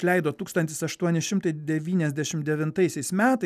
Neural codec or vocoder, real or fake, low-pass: none; real; 14.4 kHz